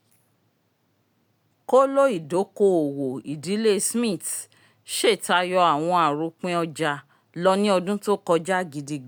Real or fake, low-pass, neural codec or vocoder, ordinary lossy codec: real; none; none; none